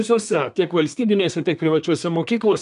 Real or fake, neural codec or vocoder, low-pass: fake; codec, 24 kHz, 1 kbps, SNAC; 10.8 kHz